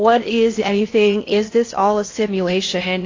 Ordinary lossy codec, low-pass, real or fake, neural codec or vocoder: AAC, 32 kbps; 7.2 kHz; fake; codec, 16 kHz in and 24 kHz out, 0.6 kbps, FocalCodec, streaming, 4096 codes